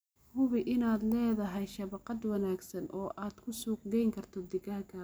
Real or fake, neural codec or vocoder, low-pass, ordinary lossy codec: real; none; none; none